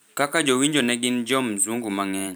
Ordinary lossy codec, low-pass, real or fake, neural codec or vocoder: none; none; fake; vocoder, 44.1 kHz, 128 mel bands every 512 samples, BigVGAN v2